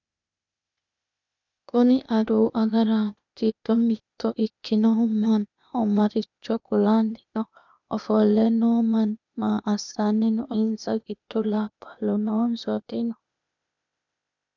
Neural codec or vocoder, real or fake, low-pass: codec, 16 kHz, 0.8 kbps, ZipCodec; fake; 7.2 kHz